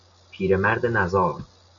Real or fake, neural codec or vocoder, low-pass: real; none; 7.2 kHz